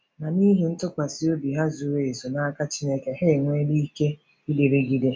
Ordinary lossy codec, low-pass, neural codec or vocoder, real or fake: none; none; none; real